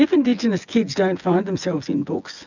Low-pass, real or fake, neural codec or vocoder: 7.2 kHz; fake; vocoder, 24 kHz, 100 mel bands, Vocos